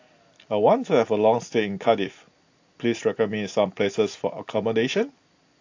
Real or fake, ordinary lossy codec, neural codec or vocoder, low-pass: real; AAC, 48 kbps; none; 7.2 kHz